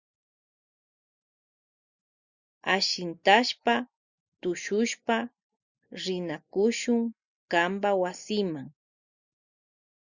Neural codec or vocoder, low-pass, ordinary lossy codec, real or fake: none; 7.2 kHz; Opus, 64 kbps; real